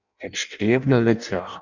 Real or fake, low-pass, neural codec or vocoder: fake; 7.2 kHz; codec, 16 kHz in and 24 kHz out, 0.6 kbps, FireRedTTS-2 codec